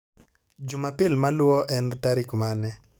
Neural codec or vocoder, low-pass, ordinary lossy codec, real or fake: codec, 44.1 kHz, 7.8 kbps, DAC; none; none; fake